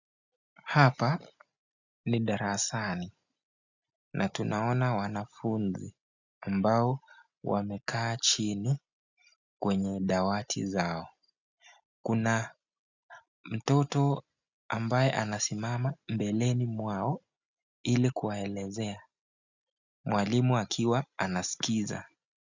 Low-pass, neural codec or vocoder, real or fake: 7.2 kHz; none; real